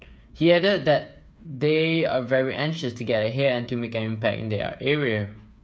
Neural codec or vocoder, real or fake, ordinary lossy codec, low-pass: codec, 16 kHz, 8 kbps, FreqCodec, smaller model; fake; none; none